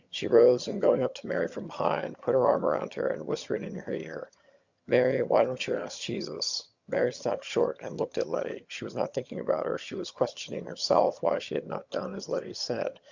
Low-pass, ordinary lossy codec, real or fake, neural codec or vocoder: 7.2 kHz; Opus, 64 kbps; fake; vocoder, 22.05 kHz, 80 mel bands, HiFi-GAN